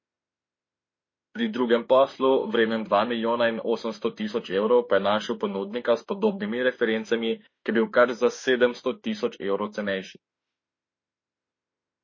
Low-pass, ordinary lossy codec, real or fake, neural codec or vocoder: 7.2 kHz; MP3, 32 kbps; fake; autoencoder, 48 kHz, 32 numbers a frame, DAC-VAE, trained on Japanese speech